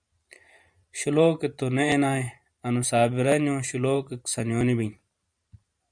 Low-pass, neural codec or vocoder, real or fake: 9.9 kHz; vocoder, 44.1 kHz, 128 mel bands every 512 samples, BigVGAN v2; fake